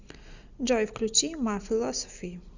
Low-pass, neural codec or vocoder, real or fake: 7.2 kHz; none; real